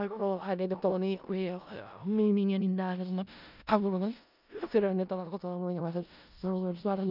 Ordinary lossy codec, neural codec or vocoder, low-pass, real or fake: none; codec, 16 kHz in and 24 kHz out, 0.4 kbps, LongCat-Audio-Codec, four codebook decoder; 5.4 kHz; fake